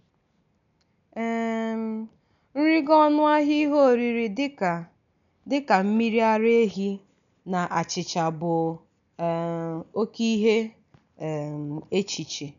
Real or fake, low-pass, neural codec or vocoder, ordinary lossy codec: real; 7.2 kHz; none; none